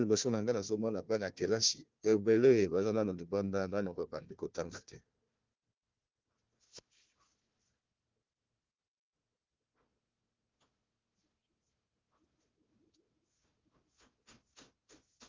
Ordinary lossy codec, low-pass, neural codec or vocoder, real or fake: Opus, 32 kbps; 7.2 kHz; codec, 16 kHz, 1 kbps, FunCodec, trained on Chinese and English, 50 frames a second; fake